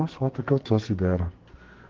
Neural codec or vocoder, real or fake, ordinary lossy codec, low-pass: codec, 44.1 kHz, 2.6 kbps, SNAC; fake; Opus, 16 kbps; 7.2 kHz